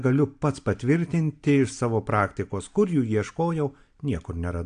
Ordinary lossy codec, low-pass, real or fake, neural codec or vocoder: AAC, 64 kbps; 9.9 kHz; real; none